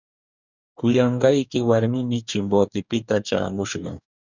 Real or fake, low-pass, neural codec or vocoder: fake; 7.2 kHz; codec, 44.1 kHz, 2.6 kbps, DAC